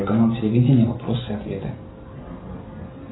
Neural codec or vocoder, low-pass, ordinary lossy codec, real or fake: none; 7.2 kHz; AAC, 16 kbps; real